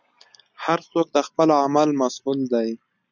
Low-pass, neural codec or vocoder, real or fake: 7.2 kHz; none; real